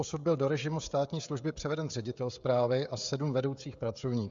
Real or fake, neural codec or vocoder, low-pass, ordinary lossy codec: fake; codec, 16 kHz, 16 kbps, FreqCodec, smaller model; 7.2 kHz; Opus, 64 kbps